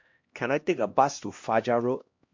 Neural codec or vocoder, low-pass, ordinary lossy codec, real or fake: codec, 16 kHz, 1 kbps, X-Codec, HuBERT features, trained on LibriSpeech; 7.2 kHz; MP3, 48 kbps; fake